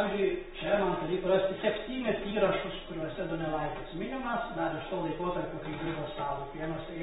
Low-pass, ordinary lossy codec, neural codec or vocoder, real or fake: 10.8 kHz; AAC, 16 kbps; none; real